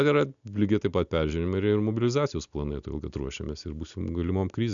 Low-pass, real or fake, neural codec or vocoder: 7.2 kHz; real; none